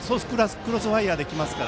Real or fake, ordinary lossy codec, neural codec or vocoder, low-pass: real; none; none; none